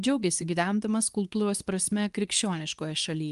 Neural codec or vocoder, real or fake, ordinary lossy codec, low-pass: codec, 24 kHz, 0.9 kbps, WavTokenizer, medium speech release version 2; fake; Opus, 32 kbps; 10.8 kHz